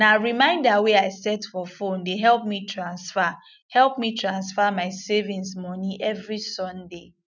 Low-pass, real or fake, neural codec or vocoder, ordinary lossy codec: 7.2 kHz; real; none; none